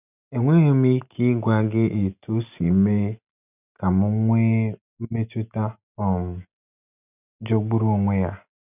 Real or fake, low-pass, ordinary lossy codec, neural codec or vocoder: real; 3.6 kHz; none; none